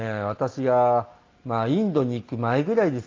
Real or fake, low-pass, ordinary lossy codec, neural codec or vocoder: real; 7.2 kHz; Opus, 24 kbps; none